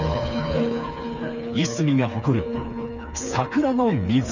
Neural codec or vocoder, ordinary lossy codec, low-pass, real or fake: codec, 16 kHz, 4 kbps, FreqCodec, smaller model; none; 7.2 kHz; fake